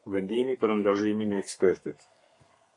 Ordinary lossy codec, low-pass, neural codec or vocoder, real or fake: AAC, 48 kbps; 10.8 kHz; codec, 32 kHz, 1.9 kbps, SNAC; fake